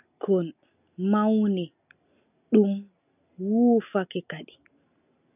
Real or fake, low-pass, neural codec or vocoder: real; 3.6 kHz; none